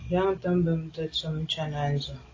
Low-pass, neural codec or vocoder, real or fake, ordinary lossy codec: 7.2 kHz; none; real; AAC, 32 kbps